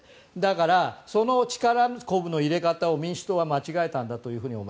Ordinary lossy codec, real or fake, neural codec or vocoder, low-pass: none; real; none; none